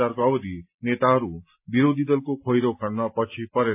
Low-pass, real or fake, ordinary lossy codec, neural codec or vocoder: 3.6 kHz; real; AAC, 32 kbps; none